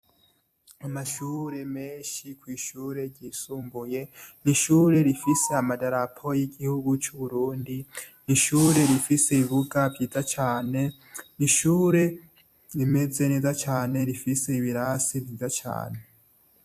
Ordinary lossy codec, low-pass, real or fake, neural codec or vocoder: AAC, 96 kbps; 14.4 kHz; fake; vocoder, 44.1 kHz, 128 mel bands every 256 samples, BigVGAN v2